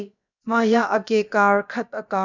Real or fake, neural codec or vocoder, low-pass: fake; codec, 16 kHz, about 1 kbps, DyCAST, with the encoder's durations; 7.2 kHz